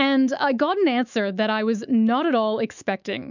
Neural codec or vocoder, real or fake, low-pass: autoencoder, 48 kHz, 128 numbers a frame, DAC-VAE, trained on Japanese speech; fake; 7.2 kHz